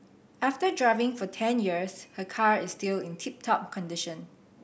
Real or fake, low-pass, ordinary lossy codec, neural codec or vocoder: real; none; none; none